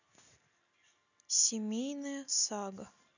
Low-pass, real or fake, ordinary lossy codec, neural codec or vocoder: 7.2 kHz; real; none; none